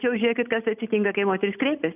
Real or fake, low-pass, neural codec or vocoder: real; 3.6 kHz; none